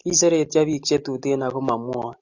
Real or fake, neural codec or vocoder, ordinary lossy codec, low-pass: real; none; AAC, 48 kbps; 7.2 kHz